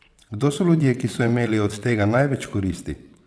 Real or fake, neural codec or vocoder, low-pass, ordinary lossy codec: fake; vocoder, 22.05 kHz, 80 mel bands, WaveNeXt; none; none